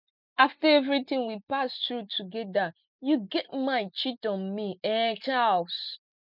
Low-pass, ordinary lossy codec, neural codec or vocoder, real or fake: 5.4 kHz; none; none; real